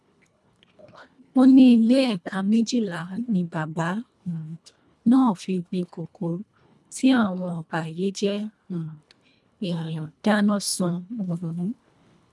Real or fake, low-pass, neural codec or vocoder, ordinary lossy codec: fake; none; codec, 24 kHz, 1.5 kbps, HILCodec; none